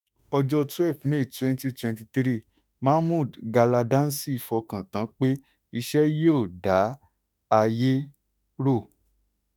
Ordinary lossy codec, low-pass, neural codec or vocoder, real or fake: none; none; autoencoder, 48 kHz, 32 numbers a frame, DAC-VAE, trained on Japanese speech; fake